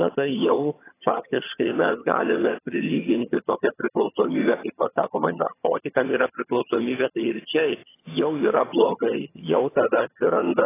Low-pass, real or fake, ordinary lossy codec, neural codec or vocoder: 3.6 kHz; fake; AAC, 16 kbps; vocoder, 22.05 kHz, 80 mel bands, HiFi-GAN